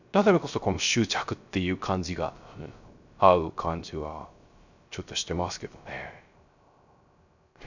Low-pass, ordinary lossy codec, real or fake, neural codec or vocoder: 7.2 kHz; none; fake; codec, 16 kHz, 0.3 kbps, FocalCodec